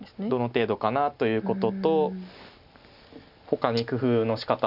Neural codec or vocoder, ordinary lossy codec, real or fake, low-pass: none; none; real; 5.4 kHz